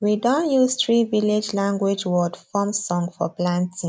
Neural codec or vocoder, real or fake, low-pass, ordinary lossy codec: none; real; none; none